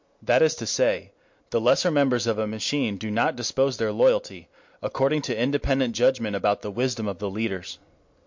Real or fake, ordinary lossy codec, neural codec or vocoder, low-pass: real; MP3, 48 kbps; none; 7.2 kHz